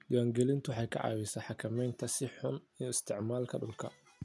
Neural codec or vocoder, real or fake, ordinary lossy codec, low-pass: none; real; none; none